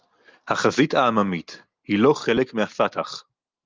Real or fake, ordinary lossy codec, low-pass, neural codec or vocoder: real; Opus, 24 kbps; 7.2 kHz; none